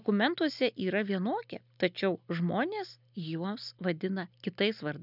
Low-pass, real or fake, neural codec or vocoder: 5.4 kHz; real; none